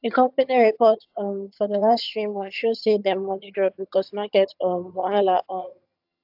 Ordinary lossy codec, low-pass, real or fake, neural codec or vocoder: AAC, 48 kbps; 5.4 kHz; fake; vocoder, 22.05 kHz, 80 mel bands, HiFi-GAN